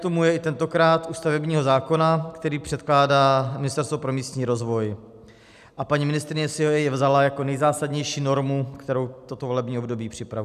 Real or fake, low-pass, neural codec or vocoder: real; 14.4 kHz; none